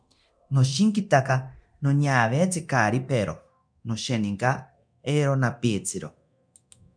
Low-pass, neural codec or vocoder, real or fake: 9.9 kHz; codec, 24 kHz, 0.9 kbps, DualCodec; fake